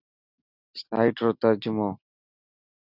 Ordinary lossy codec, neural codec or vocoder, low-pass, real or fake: Opus, 64 kbps; none; 5.4 kHz; real